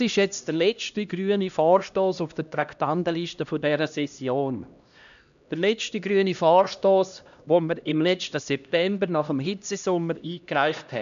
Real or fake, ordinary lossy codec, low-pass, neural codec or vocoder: fake; none; 7.2 kHz; codec, 16 kHz, 1 kbps, X-Codec, HuBERT features, trained on LibriSpeech